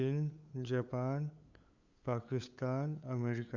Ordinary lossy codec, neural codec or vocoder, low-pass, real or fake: Opus, 64 kbps; codec, 16 kHz, 8 kbps, FunCodec, trained on LibriTTS, 25 frames a second; 7.2 kHz; fake